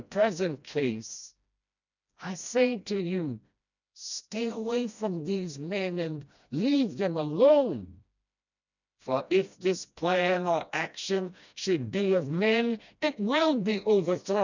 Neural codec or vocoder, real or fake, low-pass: codec, 16 kHz, 1 kbps, FreqCodec, smaller model; fake; 7.2 kHz